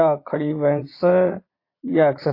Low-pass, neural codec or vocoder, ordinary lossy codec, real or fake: 5.4 kHz; vocoder, 22.05 kHz, 80 mel bands, Vocos; AAC, 32 kbps; fake